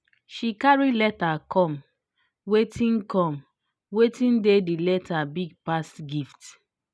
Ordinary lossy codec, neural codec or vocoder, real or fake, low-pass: none; none; real; none